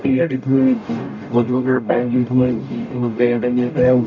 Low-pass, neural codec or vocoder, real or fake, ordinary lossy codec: 7.2 kHz; codec, 44.1 kHz, 0.9 kbps, DAC; fake; none